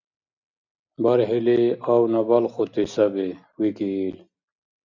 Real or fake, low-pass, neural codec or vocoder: real; 7.2 kHz; none